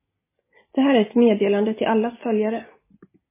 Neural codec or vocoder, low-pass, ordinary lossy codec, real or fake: none; 3.6 kHz; MP3, 16 kbps; real